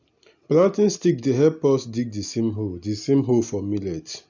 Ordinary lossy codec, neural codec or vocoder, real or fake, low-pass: none; none; real; 7.2 kHz